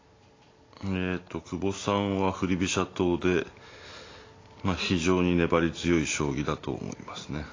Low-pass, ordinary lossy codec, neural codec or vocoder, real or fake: 7.2 kHz; AAC, 32 kbps; none; real